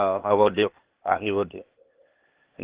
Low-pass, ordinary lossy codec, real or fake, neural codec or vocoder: 3.6 kHz; Opus, 16 kbps; fake; codec, 16 kHz, 0.8 kbps, ZipCodec